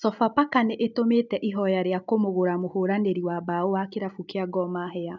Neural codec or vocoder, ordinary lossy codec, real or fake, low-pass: none; none; real; 7.2 kHz